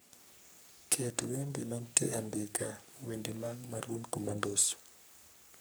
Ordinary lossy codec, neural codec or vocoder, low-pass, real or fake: none; codec, 44.1 kHz, 3.4 kbps, Pupu-Codec; none; fake